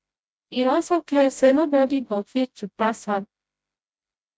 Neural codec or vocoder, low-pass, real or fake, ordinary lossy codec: codec, 16 kHz, 0.5 kbps, FreqCodec, smaller model; none; fake; none